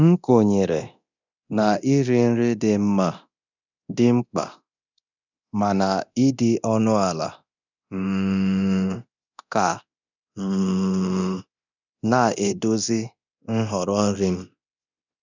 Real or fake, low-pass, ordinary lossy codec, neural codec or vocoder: fake; 7.2 kHz; none; codec, 24 kHz, 0.9 kbps, DualCodec